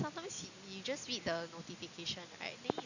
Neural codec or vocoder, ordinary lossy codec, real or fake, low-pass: none; none; real; 7.2 kHz